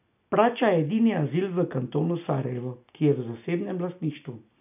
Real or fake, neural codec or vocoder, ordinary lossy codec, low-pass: real; none; none; 3.6 kHz